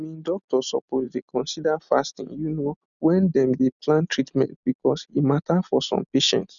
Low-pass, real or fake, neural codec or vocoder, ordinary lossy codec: 7.2 kHz; real; none; none